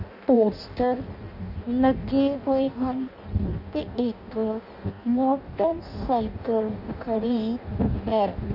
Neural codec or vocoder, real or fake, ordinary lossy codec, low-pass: codec, 16 kHz in and 24 kHz out, 0.6 kbps, FireRedTTS-2 codec; fake; none; 5.4 kHz